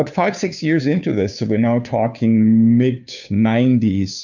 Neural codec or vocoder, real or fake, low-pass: codec, 16 kHz, 2 kbps, FunCodec, trained on Chinese and English, 25 frames a second; fake; 7.2 kHz